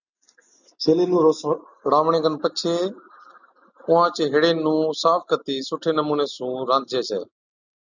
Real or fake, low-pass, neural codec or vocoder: real; 7.2 kHz; none